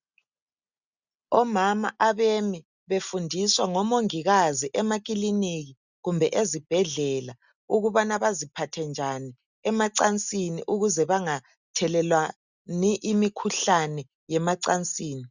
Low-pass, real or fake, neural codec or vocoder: 7.2 kHz; real; none